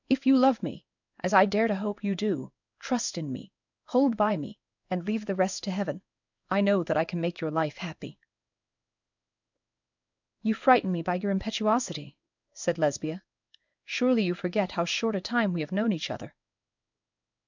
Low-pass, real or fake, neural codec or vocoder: 7.2 kHz; fake; codec, 16 kHz in and 24 kHz out, 1 kbps, XY-Tokenizer